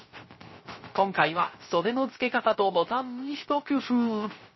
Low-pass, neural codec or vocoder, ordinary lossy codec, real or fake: 7.2 kHz; codec, 16 kHz, 0.3 kbps, FocalCodec; MP3, 24 kbps; fake